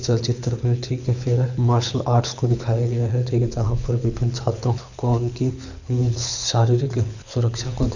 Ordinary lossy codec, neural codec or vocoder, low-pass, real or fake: none; codec, 24 kHz, 6 kbps, HILCodec; 7.2 kHz; fake